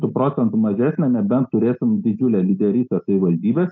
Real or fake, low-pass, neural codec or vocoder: real; 7.2 kHz; none